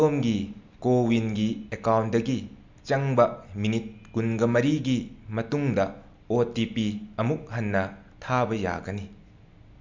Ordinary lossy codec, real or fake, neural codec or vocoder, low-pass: MP3, 64 kbps; real; none; 7.2 kHz